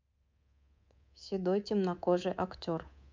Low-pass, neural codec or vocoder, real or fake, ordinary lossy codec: 7.2 kHz; codec, 24 kHz, 3.1 kbps, DualCodec; fake; MP3, 64 kbps